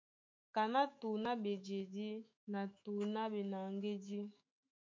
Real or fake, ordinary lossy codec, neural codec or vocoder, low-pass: fake; MP3, 48 kbps; autoencoder, 48 kHz, 128 numbers a frame, DAC-VAE, trained on Japanese speech; 7.2 kHz